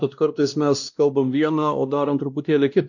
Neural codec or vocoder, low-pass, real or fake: codec, 16 kHz, 1 kbps, X-Codec, WavLM features, trained on Multilingual LibriSpeech; 7.2 kHz; fake